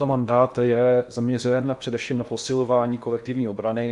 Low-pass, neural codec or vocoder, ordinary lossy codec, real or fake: 10.8 kHz; codec, 16 kHz in and 24 kHz out, 0.8 kbps, FocalCodec, streaming, 65536 codes; MP3, 96 kbps; fake